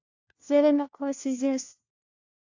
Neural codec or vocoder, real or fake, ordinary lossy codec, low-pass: codec, 16 kHz, 1 kbps, FunCodec, trained on LibriTTS, 50 frames a second; fake; AAC, 48 kbps; 7.2 kHz